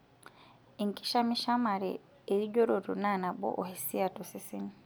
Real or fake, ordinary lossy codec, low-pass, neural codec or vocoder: real; none; none; none